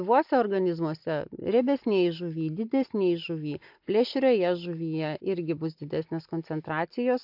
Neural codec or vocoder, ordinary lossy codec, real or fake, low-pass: none; AAC, 48 kbps; real; 5.4 kHz